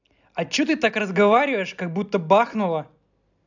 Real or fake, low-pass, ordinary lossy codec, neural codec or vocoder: real; 7.2 kHz; none; none